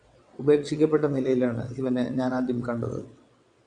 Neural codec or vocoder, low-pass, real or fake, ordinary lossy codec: vocoder, 22.05 kHz, 80 mel bands, WaveNeXt; 9.9 kHz; fake; AAC, 64 kbps